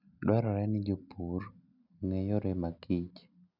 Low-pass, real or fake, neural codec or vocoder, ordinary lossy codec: 5.4 kHz; real; none; none